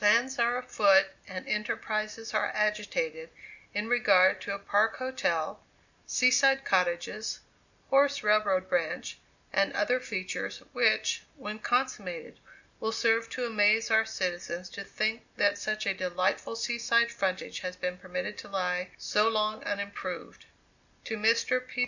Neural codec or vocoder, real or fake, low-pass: none; real; 7.2 kHz